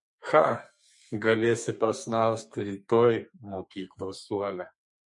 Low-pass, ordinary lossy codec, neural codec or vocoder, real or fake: 10.8 kHz; MP3, 48 kbps; codec, 32 kHz, 1.9 kbps, SNAC; fake